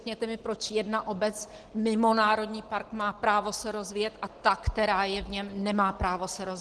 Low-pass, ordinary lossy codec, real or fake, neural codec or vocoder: 10.8 kHz; Opus, 16 kbps; real; none